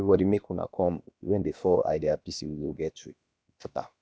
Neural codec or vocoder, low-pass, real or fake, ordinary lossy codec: codec, 16 kHz, about 1 kbps, DyCAST, with the encoder's durations; none; fake; none